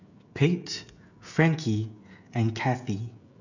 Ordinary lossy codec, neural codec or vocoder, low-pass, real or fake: none; codec, 16 kHz, 16 kbps, FreqCodec, smaller model; 7.2 kHz; fake